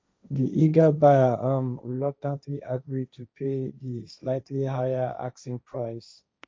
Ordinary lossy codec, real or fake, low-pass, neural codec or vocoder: none; fake; none; codec, 16 kHz, 1.1 kbps, Voila-Tokenizer